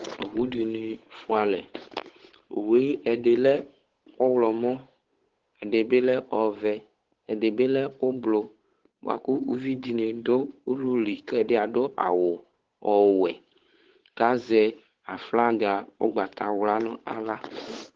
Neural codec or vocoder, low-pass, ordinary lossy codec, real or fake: codec, 16 kHz, 8 kbps, FunCodec, trained on Chinese and English, 25 frames a second; 7.2 kHz; Opus, 16 kbps; fake